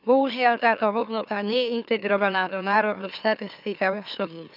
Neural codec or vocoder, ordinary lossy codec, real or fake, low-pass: autoencoder, 44.1 kHz, a latent of 192 numbers a frame, MeloTTS; none; fake; 5.4 kHz